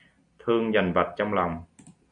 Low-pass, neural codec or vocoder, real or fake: 9.9 kHz; none; real